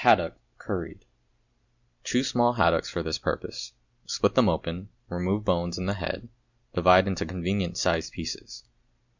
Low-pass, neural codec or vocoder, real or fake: 7.2 kHz; none; real